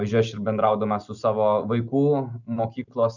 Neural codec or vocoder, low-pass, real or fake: none; 7.2 kHz; real